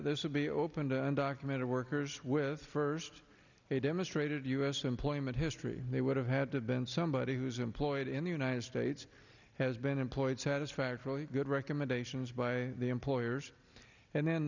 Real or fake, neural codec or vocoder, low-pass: real; none; 7.2 kHz